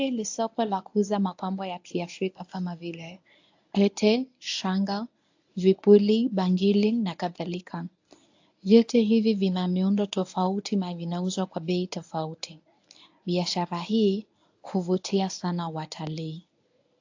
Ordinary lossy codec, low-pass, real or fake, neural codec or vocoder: AAC, 48 kbps; 7.2 kHz; fake; codec, 24 kHz, 0.9 kbps, WavTokenizer, medium speech release version 1